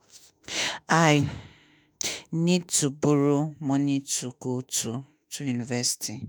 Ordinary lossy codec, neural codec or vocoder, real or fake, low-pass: none; autoencoder, 48 kHz, 32 numbers a frame, DAC-VAE, trained on Japanese speech; fake; none